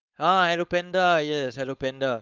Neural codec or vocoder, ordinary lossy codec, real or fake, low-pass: codec, 16 kHz, 4.8 kbps, FACodec; Opus, 32 kbps; fake; 7.2 kHz